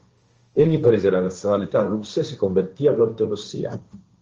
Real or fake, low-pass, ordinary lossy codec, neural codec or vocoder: fake; 7.2 kHz; Opus, 24 kbps; codec, 16 kHz, 1.1 kbps, Voila-Tokenizer